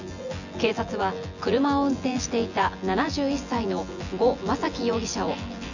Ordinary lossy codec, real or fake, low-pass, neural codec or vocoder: none; fake; 7.2 kHz; vocoder, 24 kHz, 100 mel bands, Vocos